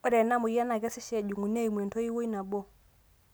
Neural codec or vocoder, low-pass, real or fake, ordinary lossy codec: none; none; real; none